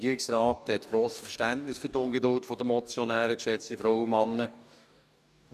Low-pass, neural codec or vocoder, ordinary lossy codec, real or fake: 14.4 kHz; codec, 44.1 kHz, 2.6 kbps, DAC; none; fake